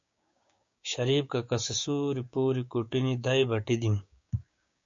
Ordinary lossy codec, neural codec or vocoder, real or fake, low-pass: MP3, 48 kbps; codec, 16 kHz, 6 kbps, DAC; fake; 7.2 kHz